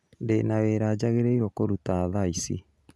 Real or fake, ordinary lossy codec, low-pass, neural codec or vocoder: real; none; none; none